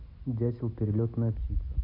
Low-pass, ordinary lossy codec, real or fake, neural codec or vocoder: 5.4 kHz; none; real; none